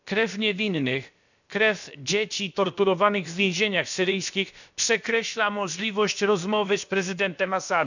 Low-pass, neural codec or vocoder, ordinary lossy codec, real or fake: 7.2 kHz; codec, 16 kHz, about 1 kbps, DyCAST, with the encoder's durations; none; fake